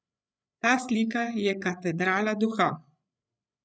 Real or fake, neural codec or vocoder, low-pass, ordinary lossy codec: fake; codec, 16 kHz, 8 kbps, FreqCodec, larger model; none; none